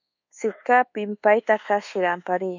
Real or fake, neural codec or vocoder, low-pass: fake; codec, 24 kHz, 1.2 kbps, DualCodec; 7.2 kHz